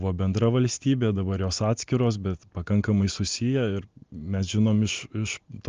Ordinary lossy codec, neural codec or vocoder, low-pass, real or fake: Opus, 32 kbps; none; 7.2 kHz; real